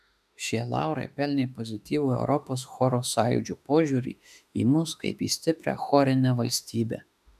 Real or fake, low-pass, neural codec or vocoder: fake; 14.4 kHz; autoencoder, 48 kHz, 32 numbers a frame, DAC-VAE, trained on Japanese speech